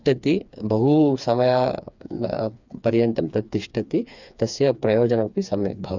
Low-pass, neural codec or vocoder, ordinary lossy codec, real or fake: 7.2 kHz; codec, 16 kHz, 4 kbps, FreqCodec, smaller model; none; fake